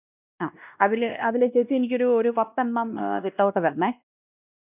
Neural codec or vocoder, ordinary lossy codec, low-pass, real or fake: codec, 16 kHz, 1 kbps, X-Codec, WavLM features, trained on Multilingual LibriSpeech; none; 3.6 kHz; fake